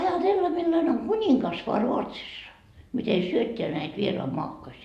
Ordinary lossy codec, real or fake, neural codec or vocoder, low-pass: none; fake; vocoder, 44.1 kHz, 128 mel bands every 512 samples, BigVGAN v2; 14.4 kHz